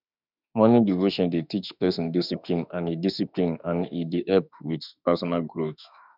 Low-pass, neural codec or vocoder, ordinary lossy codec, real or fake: 5.4 kHz; autoencoder, 48 kHz, 32 numbers a frame, DAC-VAE, trained on Japanese speech; none; fake